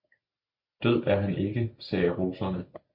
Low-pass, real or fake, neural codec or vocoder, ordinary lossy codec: 5.4 kHz; real; none; MP3, 48 kbps